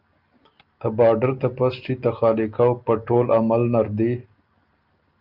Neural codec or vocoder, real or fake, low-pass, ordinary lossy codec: none; real; 5.4 kHz; Opus, 24 kbps